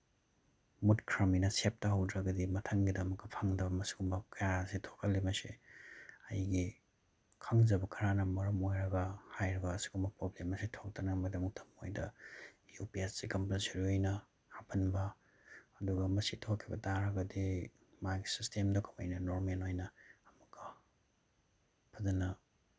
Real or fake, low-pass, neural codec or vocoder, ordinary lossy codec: real; none; none; none